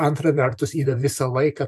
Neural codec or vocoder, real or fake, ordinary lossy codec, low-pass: vocoder, 44.1 kHz, 128 mel bands every 512 samples, BigVGAN v2; fake; MP3, 96 kbps; 14.4 kHz